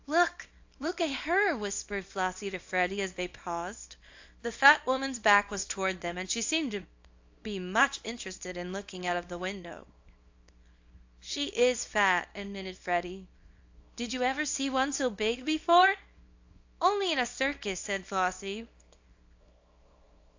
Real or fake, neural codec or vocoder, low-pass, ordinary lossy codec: fake; codec, 24 kHz, 0.9 kbps, WavTokenizer, small release; 7.2 kHz; AAC, 48 kbps